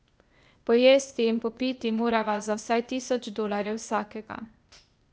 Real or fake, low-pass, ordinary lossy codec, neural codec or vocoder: fake; none; none; codec, 16 kHz, 0.8 kbps, ZipCodec